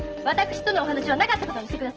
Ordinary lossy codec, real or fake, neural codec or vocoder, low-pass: Opus, 16 kbps; real; none; 7.2 kHz